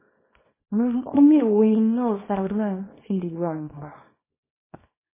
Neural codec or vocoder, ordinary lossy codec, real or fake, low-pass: codec, 24 kHz, 0.9 kbps, WavTokenizer, small release; MP3, 16 kbps; fake; 3.6 kHz